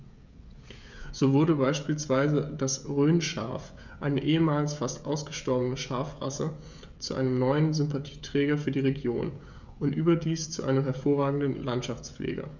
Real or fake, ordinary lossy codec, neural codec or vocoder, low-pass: fake; none; codec, 16 kHz, 16 kbps, FreqCodec, smaller model; 7.2 kHz